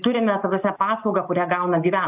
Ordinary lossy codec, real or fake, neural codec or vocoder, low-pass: AAC, 32 kbps; real; none; 3.6 kHz